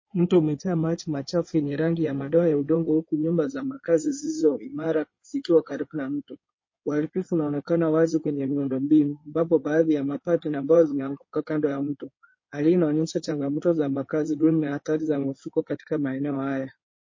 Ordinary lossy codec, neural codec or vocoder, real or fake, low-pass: MP3, 32 kbps; codec, 16 kHz in and 24 kHz out, 2.2 kbps, FireRedTTS-2 codec; fake; 7.2 kHz